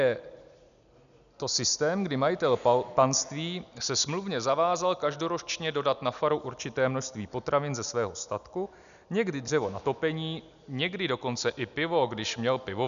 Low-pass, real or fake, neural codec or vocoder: 7.2 kHz; real; none